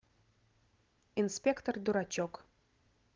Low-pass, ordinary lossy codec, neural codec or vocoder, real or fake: 7.2 kHz; Opus, 16 kbps; none; real